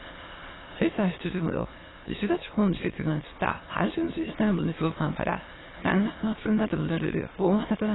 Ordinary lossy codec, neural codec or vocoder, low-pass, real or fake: AAC, 16 kbps; autoencoder, 22.05 kHz, a latent of 192 numbers a frame, VITS, trained on many speakers; 7.2 kHz; fake